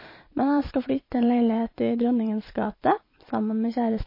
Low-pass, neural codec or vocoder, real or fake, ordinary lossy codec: 5.4 kHz; vocoder, 44.1 kHz, 128 mel bands every 512 samples, BigVGAN v2; fake; MP3, 24 kbps